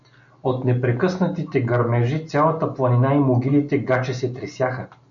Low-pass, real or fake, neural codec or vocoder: 7.2 kHz; real; none